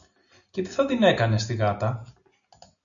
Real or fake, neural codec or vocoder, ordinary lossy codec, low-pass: real; none; MP3, 96 kbps; 7.2 kHz